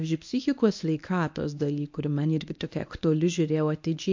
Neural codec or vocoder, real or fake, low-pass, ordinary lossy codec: codec, 24 kHz, 0.9 kbps, WavTokenizer, medium speech release version 1; fake; 7.2 kHz; MP3, 48 kbps